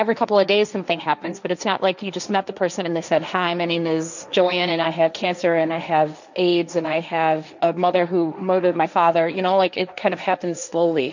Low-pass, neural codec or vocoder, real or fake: 7.2 kHz; codec, 16 kHz, 1.1 kbps, Voila-Tokenizer; fake